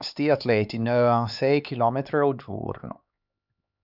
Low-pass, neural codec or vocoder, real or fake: 5.4 kHz; codec, 16 kHz, 4 kbps, X-Codec, HuBERT features, trained on LibriSpeech; fake